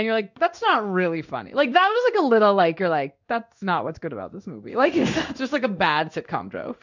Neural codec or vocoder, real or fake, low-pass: codec, 16 kHz in and 24 kHz out, 1 kbps, XY-Tokenizer; fake; 7.2 kHz